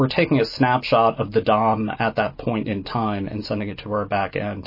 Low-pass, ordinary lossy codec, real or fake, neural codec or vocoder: 5.4 kHz; MP3, 24 kbps; real; none